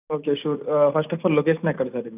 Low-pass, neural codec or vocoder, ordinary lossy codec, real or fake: 3.6 kHz; none; none; real